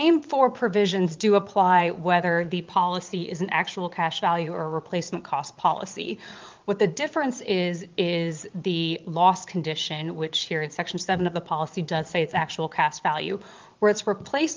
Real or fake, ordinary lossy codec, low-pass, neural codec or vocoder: fake; Opus, 32 kbps; 7.2 kHz; vocoder, 22.05 kHz, 80 mel bands, Vocos